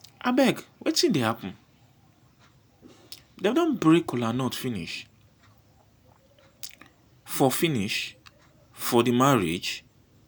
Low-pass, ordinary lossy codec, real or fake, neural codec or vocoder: none; none; real; none